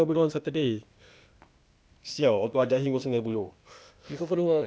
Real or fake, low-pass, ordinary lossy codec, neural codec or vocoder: fake; none; none; codec, 16 kHz, 0.8 kbps, ZipCodec